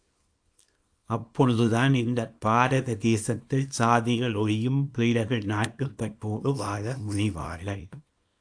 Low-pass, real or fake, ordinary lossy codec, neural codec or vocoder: 9.9 kHz; fake; AAC, 64 kbps; codec, 24 kHz, 0.9 kbps, WavTokenizer, small release